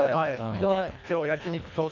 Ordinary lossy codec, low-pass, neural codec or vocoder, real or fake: none; 7.2 kHz; codec, 24 kHz, 1.5 kbps, HILCodec; fake